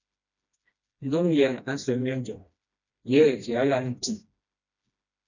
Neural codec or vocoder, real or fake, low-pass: codec, 16 kHz, 1 kbps, FreqCodec, smaller model; fake; 7.2 kHz